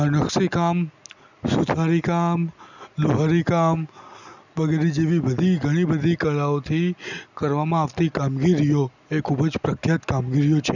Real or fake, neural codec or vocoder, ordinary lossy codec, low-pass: real; none; none; 7.2 kHz